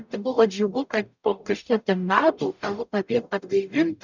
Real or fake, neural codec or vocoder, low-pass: fake; codec, 44.1 kHz, 0.9 kbps, DAC; 7.2 kHz